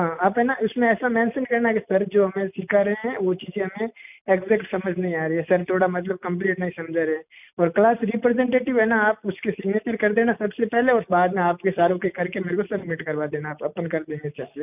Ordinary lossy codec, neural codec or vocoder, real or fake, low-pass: none; none; real; 3.6 kHz